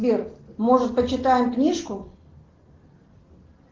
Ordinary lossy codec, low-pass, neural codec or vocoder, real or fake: Opus, 16 kbps; 7.2 kHz; none; real